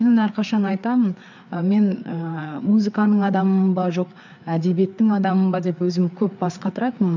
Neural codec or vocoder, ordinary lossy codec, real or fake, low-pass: codec, 16 kHz, 4 kbps, FreqCodec, larger model; none; fake; 7.2 kHz